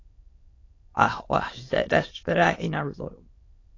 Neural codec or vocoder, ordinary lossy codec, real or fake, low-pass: autoencoder, 22.05 kHz, a latent of 192 numbers a frame, VITS, trained on many speakers; AAC, 32 kbps; fake; 7.2 kHz